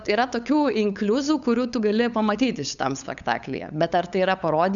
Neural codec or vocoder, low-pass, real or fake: codec, 16 kHz, 8 kbps, FunCodec, trained on LibriTTS, 25 frames a second; 7.2 kHz; fake